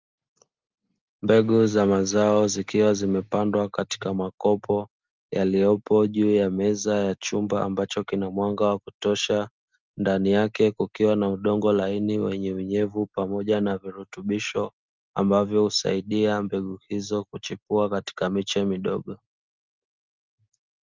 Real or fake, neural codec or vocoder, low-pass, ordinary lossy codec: real; none; 7.2 kHz; Opus, 32 kbps